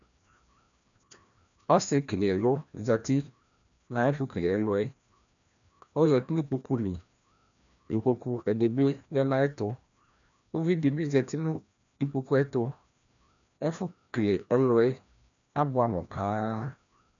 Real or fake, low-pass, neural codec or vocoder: fake; 7.2 kHz; codec, 16 kHz, 1 kbps, FreqCodec, larger model